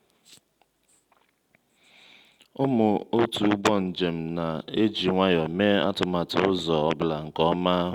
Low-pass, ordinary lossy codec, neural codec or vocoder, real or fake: 19.8 kHz; Opus, 64 kbps; none; real